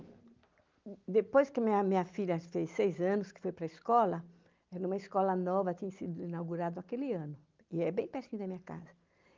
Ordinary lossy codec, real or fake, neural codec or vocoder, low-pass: Opus, 32 kbps; real; none; 7.2 kHz